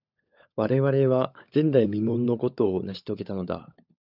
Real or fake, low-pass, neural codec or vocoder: fake; 5.4 kHz; codec, 16 kHz, 16 kbps, FunCodec, trained on LibriTTS, 50 frames a second